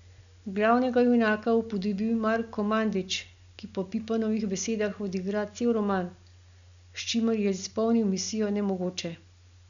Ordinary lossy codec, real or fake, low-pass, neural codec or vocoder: none; real; 7.2 kHz; none